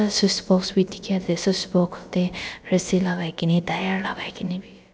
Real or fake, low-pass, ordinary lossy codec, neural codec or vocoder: fake; none; none; codec, 16 kHz, about 1 kbps, DyCAST, with the encoder's durations